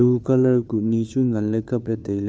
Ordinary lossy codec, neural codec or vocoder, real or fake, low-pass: none; codec, 16 kHz, 2 kbps, FunCodec, trained on Chinese and English, 25 frames a second; fake; none